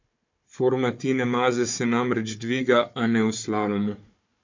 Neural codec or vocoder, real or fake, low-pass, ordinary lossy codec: codec, 16 kHz, 4 kbps, FunCodec, trained on Chinese and English, 50 frames a second; fake; 7.2 kHz; MP3, 64 kbps